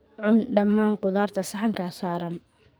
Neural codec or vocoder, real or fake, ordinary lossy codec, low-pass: codec, 44.1 kHz, 2.6 kbps, SNAC; fake; none; none